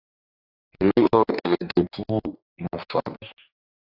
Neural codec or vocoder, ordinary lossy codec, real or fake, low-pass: codec, 44.1 kHz, 2.6 kbps, DAC; AAC, 32 kbps; fake; 5.4 kHz